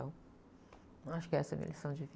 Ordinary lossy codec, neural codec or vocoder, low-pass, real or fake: none; none; none; real